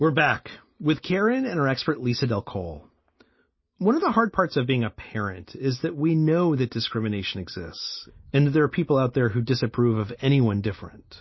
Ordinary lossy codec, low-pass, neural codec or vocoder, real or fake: MP3, 24 kbps; 7.2 kHz; none; real